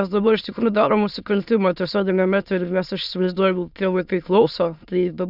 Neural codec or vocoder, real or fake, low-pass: autoencoder, 22.05 kHz, a latent of 192 numbers a frame, VITS, trained on many speakers; fake; 5.4 kHz